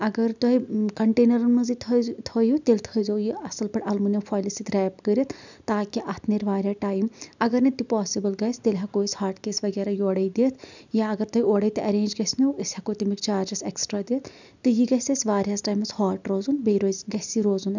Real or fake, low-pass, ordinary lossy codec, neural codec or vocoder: real; 7.2 kHz; none; none